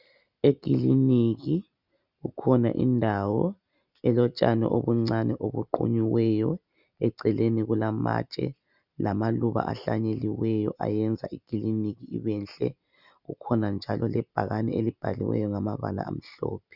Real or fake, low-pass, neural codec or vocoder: real; 5.4 kHz; none